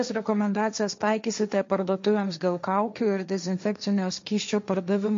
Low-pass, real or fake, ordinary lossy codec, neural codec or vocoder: 7.2 kHz; fake; MP3, 48 kbps; codec, 16 kHz, 1.1 kbps, Voila-Tokenizer